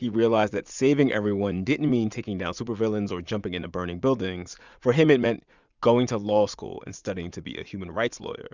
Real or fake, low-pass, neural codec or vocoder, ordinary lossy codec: fake; 7.2 kHz; vocoder, 44.1 kHz, 80 mel bands, Vocos; Opus, 64 kbps